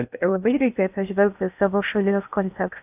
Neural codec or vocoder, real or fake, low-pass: codec, 16 kHz in and 24 kHz out, 0.6 kbps, FocalCodec, streaming, 2048 codes; fake; 3.6 kHz